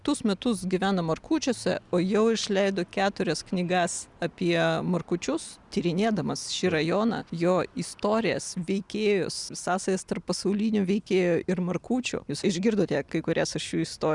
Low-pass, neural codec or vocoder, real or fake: 10.8 kHz; none; real